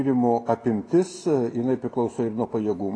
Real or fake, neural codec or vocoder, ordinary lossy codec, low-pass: real; none; AAC, 32 kbps; 9.9 kHz